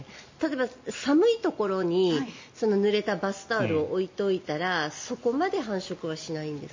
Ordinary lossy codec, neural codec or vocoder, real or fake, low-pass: MP3, 32 kbps; none; real; 7.2 kHz